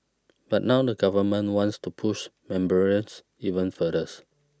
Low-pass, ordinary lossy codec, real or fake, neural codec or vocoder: none; none; real; none